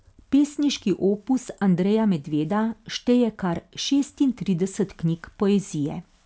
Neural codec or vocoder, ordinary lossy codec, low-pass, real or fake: none; none; none; real